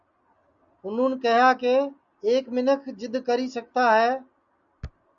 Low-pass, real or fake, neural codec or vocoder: 7.2 kHz; real; none